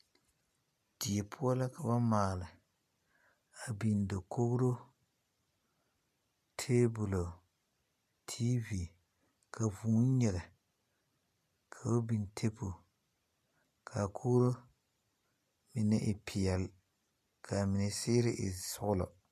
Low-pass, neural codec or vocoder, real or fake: 14.4 kHz; none; real